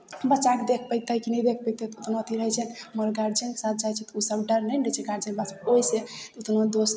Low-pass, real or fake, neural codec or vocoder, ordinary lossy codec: none; real; none; none